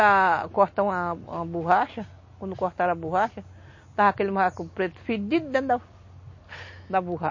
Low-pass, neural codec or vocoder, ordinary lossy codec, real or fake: 7.2 kHz; none; MP3, 32 kbps; real